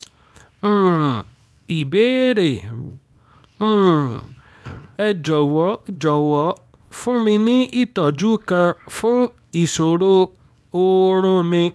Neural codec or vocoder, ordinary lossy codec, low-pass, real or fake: codec, 24 kHz, 0.9 kbps, WavTokenizer, small release; none; none; fake